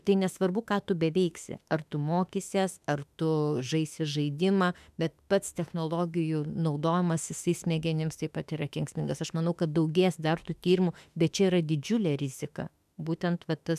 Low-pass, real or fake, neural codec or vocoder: 14.4 kHz; fake; autoencoder, 48 kHz, 32 numbers a frame, DAC-VAE, trained on Japanese speech